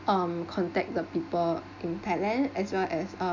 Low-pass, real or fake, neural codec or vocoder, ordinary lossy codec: 7.2 kHz; real; none; none